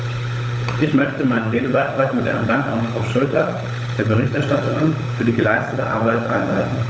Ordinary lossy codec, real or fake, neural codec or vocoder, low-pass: none; fake; codec, 16 kHz, 16 kbps, FunCodec, trained on LibriTTS, 50 frames a second; none